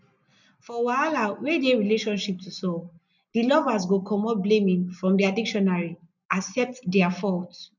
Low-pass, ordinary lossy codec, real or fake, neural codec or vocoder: 7.2 kHz; none; real; none